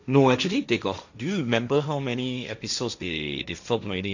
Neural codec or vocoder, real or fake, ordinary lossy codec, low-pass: codec, 16 kHz, 1.1 kbps, Voila-Tokenizer; fake; none; 7.2 kHz